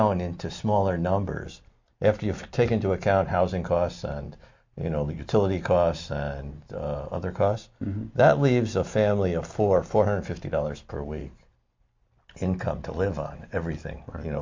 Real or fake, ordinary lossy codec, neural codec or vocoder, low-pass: real; MP3, 48 kbps; none; 7.2 kHz